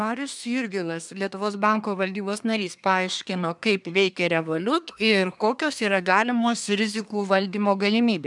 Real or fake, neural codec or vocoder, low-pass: fake; codec, 24 kHz, 1 kbps, SNAC; 10.8 kHz